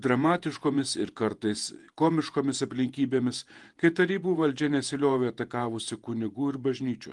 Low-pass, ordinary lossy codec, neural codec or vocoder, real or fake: 10.8 kHz; Opus, 24 kbps; vocoder, 48 kHz, 128 mel bands, Vocos; fake